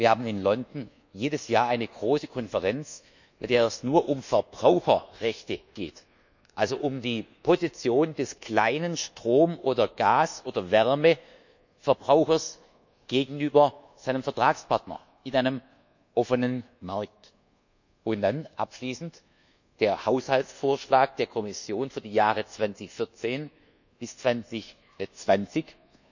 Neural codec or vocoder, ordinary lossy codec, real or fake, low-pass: codec, 24 kHz, 1.2 kbps, DualCodec; none; fake; 7.2 kHz